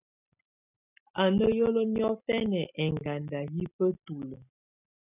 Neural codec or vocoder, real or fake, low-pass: none; real; 3.6 kHz